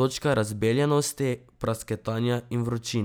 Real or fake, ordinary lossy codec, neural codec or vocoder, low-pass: real; none; none; none